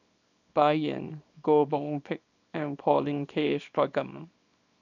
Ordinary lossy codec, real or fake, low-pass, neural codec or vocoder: none; fake; 7.2 kHz; codec, 24 kHz, 0.9 kbps, WavTokenizer, small release